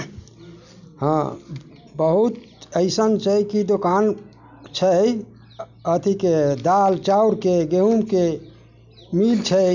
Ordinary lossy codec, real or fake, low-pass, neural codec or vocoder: none; real; 7.2 kHz; none